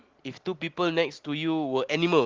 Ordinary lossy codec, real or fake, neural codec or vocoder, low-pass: Opus, 16 kbps; real; none; 7.2 kHz